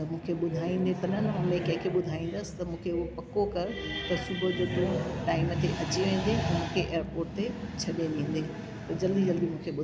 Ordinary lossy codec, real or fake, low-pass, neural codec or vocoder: none; real; none; none